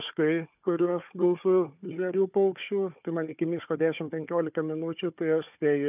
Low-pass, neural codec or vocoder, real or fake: 3.6 kHz; codec, 16 kHz, 4 kbps, FunCodec, trained on Chinese and English, 50 frames a second; fake